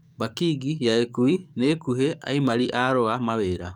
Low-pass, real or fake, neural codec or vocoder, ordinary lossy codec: 19.8 kHz; fake; codec, 44.1 kHz, 7.8 kbps, DAC; none